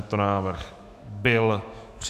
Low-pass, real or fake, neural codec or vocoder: 14.4 kHz; fake; autoencoder, 48 kHz, 128 numbers a frame, DAC-VAE, trained on Japanese speech